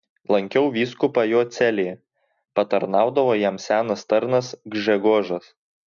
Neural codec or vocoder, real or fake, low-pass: none; real; 7.2 kHz